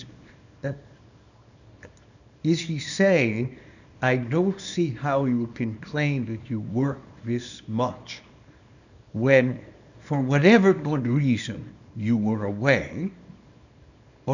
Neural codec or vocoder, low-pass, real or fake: codec, 24 kHz, 0.9 kbps, WavTokenizer, small release; 7.2 kHz; fake